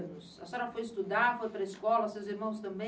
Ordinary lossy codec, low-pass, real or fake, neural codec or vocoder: none; none; real; none